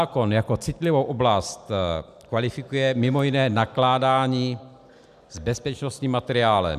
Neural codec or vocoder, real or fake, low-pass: vocoder, 44.1 kHz, 128 mel bands every 256 samples, BigVGAN v2; fake; 14.4 kHz